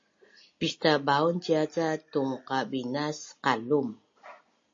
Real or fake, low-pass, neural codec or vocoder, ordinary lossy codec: real; 7.2 kHz; none; MP3, 32 kbps